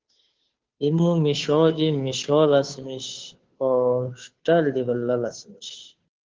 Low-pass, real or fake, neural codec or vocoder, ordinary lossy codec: 7.2 kHz; fake; codec, 16 kHz, 2 kbps, FunCodec, trained on Chinese and English, 25 frames a second; Opus, 16 kbps